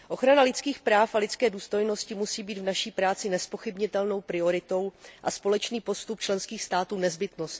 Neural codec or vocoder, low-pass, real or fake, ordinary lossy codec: none; none; real; none